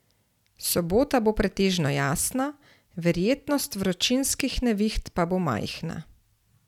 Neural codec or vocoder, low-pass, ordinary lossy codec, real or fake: none; 19.8 kHz; none; real